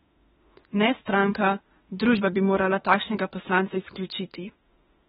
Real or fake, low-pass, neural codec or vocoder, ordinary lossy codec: fake; 19.8 kHz; autoencoder, 48 kHz, 32 numbers a frame, DAC-VAE, trained on Japanese speech; AAC, 16 kbps